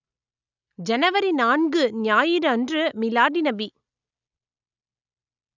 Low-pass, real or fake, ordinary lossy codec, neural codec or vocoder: 7.2 kHz; real; none; none